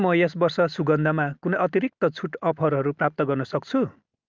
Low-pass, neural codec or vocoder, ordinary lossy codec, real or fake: 7.2 kHz; none; Opus, 24 kbps; real